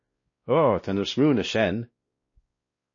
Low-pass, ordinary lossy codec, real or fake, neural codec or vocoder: 7.2 kHz; MP3, 32 kbps; fake; codec, 16 kHz, 1 kbps, X-Codec, WavLM features, trained on Multilingual LibriSpeech